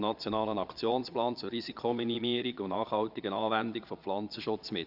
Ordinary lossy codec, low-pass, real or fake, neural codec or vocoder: Opus, 64 kbps; 5.4 kHz; fake; vocoder, 22.05 kHz, 80 mel bands, Vocos